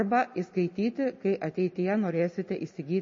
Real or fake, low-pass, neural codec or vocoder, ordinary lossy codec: real; 7.2 kHz; none; MP3, 32 kbps